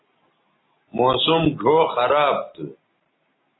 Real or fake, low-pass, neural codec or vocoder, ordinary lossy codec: real; 7.2 kHz; none; AAC, 16 kbps